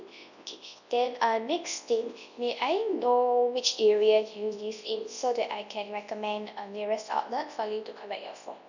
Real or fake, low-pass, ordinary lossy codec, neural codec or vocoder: fake; 7.2 kHz; none; codec, 24 kHz, 0.9 kbps, WavTokenizer, large speech release